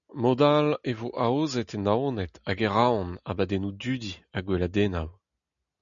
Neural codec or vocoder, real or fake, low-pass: none; real; 7.2 kHz